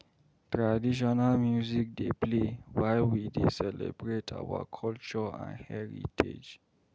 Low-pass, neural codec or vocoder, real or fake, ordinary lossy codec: none; none; real; none